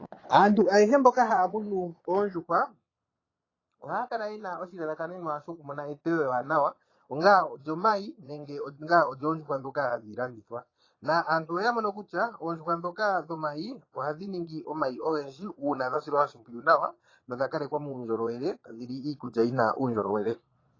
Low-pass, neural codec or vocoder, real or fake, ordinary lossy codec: 7.2 kHz; vocoder, 22.05 kHz, 80 mel bands, Vocos; fake; AAC, 32 kbps